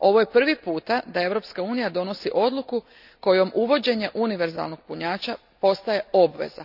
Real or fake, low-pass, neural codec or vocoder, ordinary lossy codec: real; 5.4 kHz; none; none